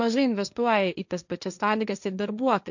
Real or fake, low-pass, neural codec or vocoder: fake; 7.2 kHz; codec, 16 kHz, 1.1 kbps, Voila-Tokenizer